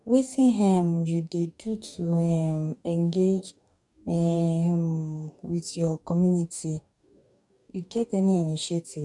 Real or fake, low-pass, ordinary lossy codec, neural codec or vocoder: fake; 10.8 kHz; none; codec, 44.1 kHz, 2.6 kbps, DAC